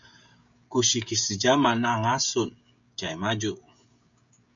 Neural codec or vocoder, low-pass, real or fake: codec, 16 kHz, 16 kbps, FreqCodec, smaller model; 7.2 kHz; fake